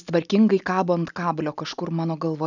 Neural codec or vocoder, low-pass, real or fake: none; 7.2 kHz; real